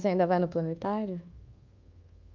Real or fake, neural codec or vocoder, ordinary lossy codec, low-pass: fake; codec, 24 kHz, 1.2 kbps, DualCodec; Opus, 24 kbps; 7.2 kHz